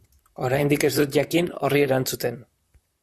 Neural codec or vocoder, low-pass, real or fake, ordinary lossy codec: vocoder, 44.1 kHz, 128 mel bands, Pupu-Vocoder; 14.4 kHz; fake; Opus, 64 kbps